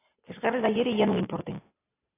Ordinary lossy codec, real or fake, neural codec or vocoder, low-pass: AAC, 16 kbps; real; none; 3.6 kHz